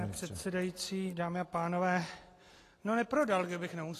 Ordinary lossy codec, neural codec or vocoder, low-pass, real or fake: AAC, 48 kbps; none; 14.4 kHz; real